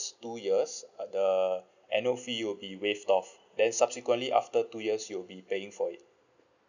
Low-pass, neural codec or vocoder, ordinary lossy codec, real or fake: 7.2 kHz; none; none; real